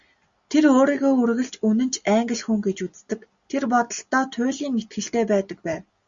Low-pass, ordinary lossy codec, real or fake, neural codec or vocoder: 7.2 kHz; Opus, 64 kbps; real; none